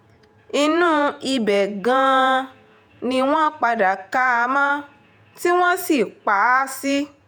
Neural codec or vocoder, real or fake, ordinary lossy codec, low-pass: vocoder, 48 kHz, 128 mel bands, Vocos; fake; none; none